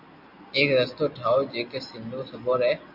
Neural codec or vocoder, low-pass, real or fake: none; 5.4 kHz; real